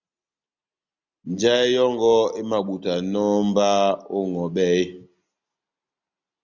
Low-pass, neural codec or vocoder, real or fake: 7.2 kHz; none; real